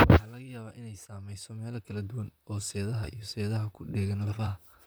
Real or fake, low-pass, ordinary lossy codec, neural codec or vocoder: fake; none; none; vocoder, 44.1 kHz, 128 mel bands, Pupu-Vocoder